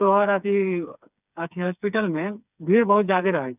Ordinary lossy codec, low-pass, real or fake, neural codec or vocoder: none; 3.6 kHz; fake; codec, 16 kHz, 4 kbps, FreqCodec, smaller model